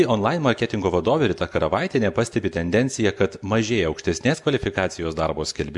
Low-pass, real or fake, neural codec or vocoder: 10.8 kHz; real; none